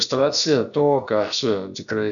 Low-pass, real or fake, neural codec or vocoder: 7.2 kHz; fake; codec, 16 kHz, about 1 kbps, DyCAST, with the encoder's durations